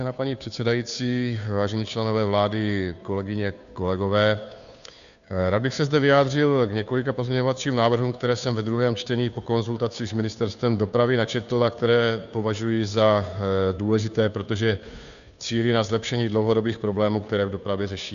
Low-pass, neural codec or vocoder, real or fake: 7.2 kHz; codec, 16 kHz, 2 kbps, FunCodec, trained on Chinese and English, 25 frames a second; fake